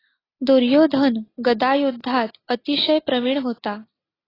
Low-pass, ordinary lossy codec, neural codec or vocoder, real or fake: 5.4 kHz; AAC, 24 kbps; none; real